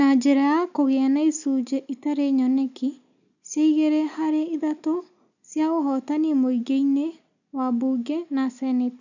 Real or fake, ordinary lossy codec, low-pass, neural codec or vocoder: real; none; 7.2 kHz; none